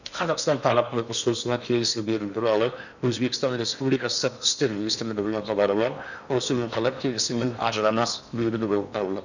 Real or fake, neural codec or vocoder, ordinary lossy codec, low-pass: fake; codec, 16 kHz in and 24 kHz out, 0.8 kbps, FocalCodec, streaming, 65536 codes; none; 7.2 kHz